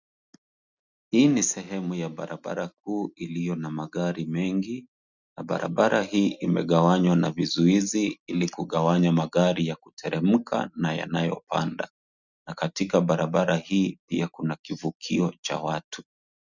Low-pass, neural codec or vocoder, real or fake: 7.2 kHz; none; real